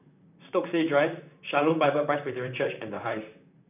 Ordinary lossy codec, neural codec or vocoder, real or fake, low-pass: none; vocoder, 44.1 kHz, 128 mel bands, Pupu-Vocoder; fake; 3.6 kHz